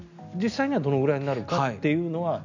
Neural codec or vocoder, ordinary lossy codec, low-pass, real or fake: none; none; 7.2 kHz; real